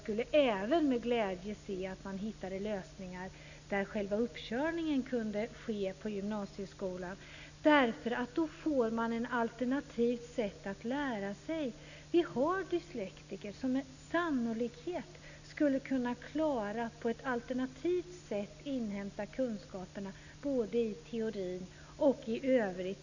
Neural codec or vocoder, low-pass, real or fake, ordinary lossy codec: none; 7.2 kHz; real; none